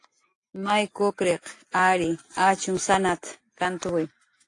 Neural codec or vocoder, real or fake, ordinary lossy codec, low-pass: none; real; AAC, 48 kbps; 10.8 kHz